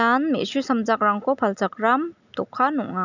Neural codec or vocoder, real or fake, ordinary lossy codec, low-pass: none; real; none; 7.2 kHz